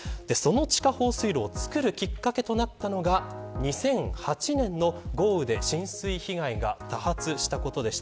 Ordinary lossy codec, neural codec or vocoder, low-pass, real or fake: none; none; none; real